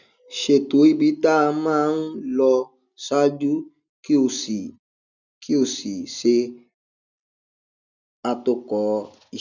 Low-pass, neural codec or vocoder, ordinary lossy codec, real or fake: 7.2 kHz; none; none; real